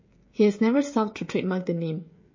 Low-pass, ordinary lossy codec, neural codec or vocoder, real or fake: 7.2 kHz; MP3, 32 kbps; codec, 16 kHz, 16 kbps, FreqCodec, smaller model; fake